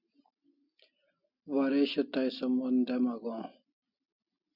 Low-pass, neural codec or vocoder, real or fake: 5.4 kHz; none; real